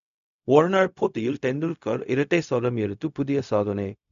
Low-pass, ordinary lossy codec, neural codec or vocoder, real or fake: 7.2 kHz; none; codec, 16 kHz, 0.4 kbps, LongCat-Audio-Codec; fake